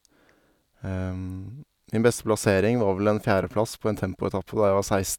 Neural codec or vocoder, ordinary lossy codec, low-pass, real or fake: none; none; 19.8 kHz; real